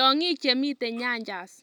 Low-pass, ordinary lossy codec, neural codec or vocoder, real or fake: 19.8 kHz; none; none; real